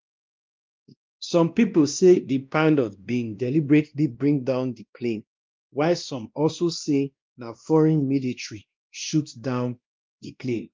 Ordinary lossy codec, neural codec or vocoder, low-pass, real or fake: Opus, 32 kbps; codec, 16 kHz, 1 kbps, X-Codec, WavLM features, trained on Multilingual LibriSpeech; 7.2 kHz; fake